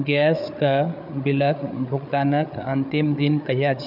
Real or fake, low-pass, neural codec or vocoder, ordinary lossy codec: fake; 5.4 kHz; codec, 16 kHz, 8 kbps, FreqCodec, larger model; none